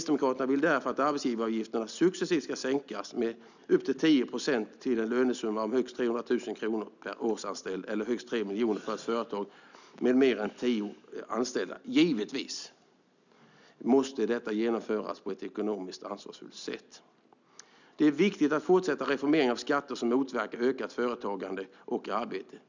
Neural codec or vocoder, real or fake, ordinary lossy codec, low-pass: none; real; none; 7.2 kHz